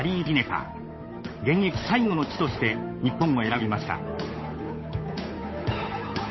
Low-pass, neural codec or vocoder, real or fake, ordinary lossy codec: 7.2 kHz; codec, 16 kHz, 8 kbps, FunCodec, trained on Chinese and English, 25 frames a second; fake; MP3, 24 kbps